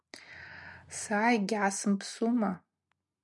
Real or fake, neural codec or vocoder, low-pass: real; none; 10.8 kHz